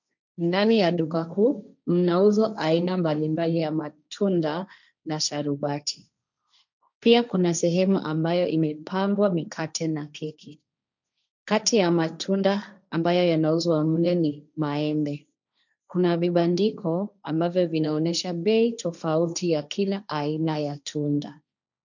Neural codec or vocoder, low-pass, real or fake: codec, 16 kHz, 1.1 kbps, Voila-Tokenizer; 7.2 kHz; fake